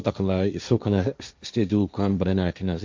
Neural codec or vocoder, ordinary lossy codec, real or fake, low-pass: codec, 16 kHz, 1.1 kbps, Voila-Tokenizer; MP3, 64 kbps; fake; 7.2 kHz